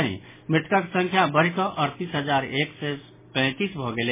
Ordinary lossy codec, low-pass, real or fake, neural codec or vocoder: MP3, 16 kbps; 3.6 kHz; real; none